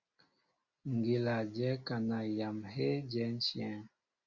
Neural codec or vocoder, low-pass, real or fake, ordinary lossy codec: none; 7.2 kHz; real; Opus, 64 kbps